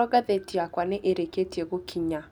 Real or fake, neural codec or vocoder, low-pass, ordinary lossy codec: real; none; 19.8 kHz; none